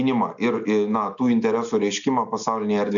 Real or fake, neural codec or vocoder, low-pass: real; none; 7.2 kHz